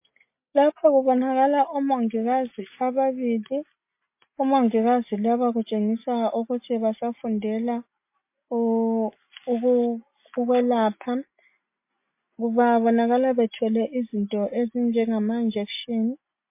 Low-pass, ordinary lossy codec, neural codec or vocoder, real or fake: 3.6 kHz; MP3, 24 kbps; none; real